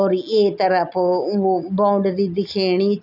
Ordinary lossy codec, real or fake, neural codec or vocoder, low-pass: none; real; none; 5.4 kHz